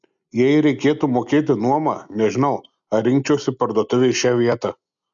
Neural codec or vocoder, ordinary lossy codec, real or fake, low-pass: none; MP3, 96 kbps; real; 7.2 kHz